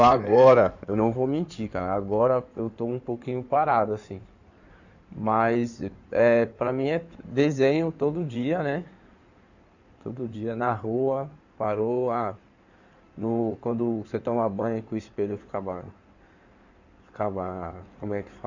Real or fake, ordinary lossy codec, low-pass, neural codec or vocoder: fake; none; 7.2 kHz; codec, 16 kHz in and 24 kHz out, 2.2 kbps, FireRedTTS-2 codec